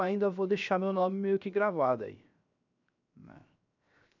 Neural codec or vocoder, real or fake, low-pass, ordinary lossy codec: codec, 16 kHz, 0.7 kbps, FocalCodec; fake; 7.2 kHz; none